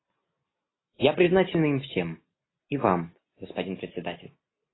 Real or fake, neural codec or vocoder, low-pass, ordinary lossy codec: real; none; 7.2 kHz; AAC, 16 kbps